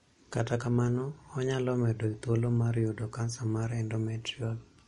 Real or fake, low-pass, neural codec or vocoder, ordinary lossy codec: real; 19.8 kHz; none; MP3, 48 kbps